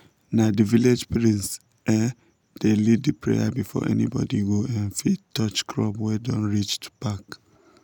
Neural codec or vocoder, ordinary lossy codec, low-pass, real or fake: none; none; 19.8 kHz; real